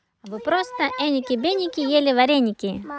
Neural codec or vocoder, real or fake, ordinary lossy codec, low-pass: none; real; none; none